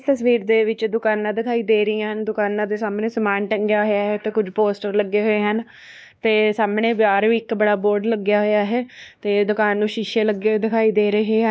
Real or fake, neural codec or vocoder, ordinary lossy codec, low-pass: fake; codec, 16 kHz, 2 kbps, X-Codec, WavLM features, trained on Multilingual LibriSpeech; none; none